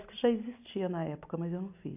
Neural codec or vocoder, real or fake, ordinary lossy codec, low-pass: none; real; AAC, 32 kbps; 3.6 kHz